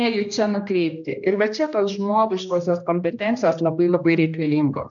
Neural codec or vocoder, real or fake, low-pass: codec, 16 kHz, 1 kbps, X-Codec, HuBERT features, trained on general audio; fake; 7.2 kHz